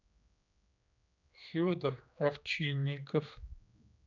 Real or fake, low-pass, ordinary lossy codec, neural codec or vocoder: fake; 7.2 kHz; none; codec, 16 kHz, 2 kbps, X-Codec, HuBERT features, trained on general audio